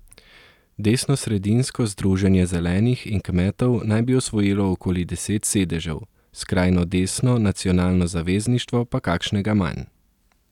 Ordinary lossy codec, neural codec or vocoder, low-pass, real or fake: none; none; 19.8 kHz; real